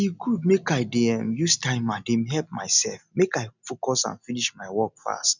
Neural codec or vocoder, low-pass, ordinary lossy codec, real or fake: none; 7.2 kHz; none; real